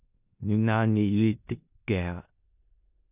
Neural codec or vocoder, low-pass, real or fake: codec, 16 kHz in and 24 kHz out, 0.4 kbps, LongCat-Audio-Codec, four codebook decoder; 3.6 kHz; fake